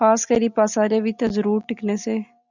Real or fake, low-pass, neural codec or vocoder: real; 7.2 kHz; none